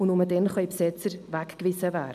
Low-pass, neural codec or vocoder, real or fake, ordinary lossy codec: 14.4 kHz; none; real; AAC, 96 kbps